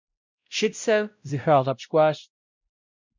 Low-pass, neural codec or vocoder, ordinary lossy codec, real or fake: 7.2 kHz; codec, 16 kHz, 0.5 kbps, X-Codec, WavLM features, trained on Multilingual LibriSpeech; MP3, 64 kbps; fake